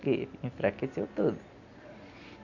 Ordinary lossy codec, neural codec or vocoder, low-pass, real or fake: none; none; 7.2 kHz; real